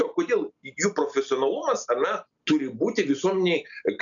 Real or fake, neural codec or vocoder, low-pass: real; none; 7.2 kHz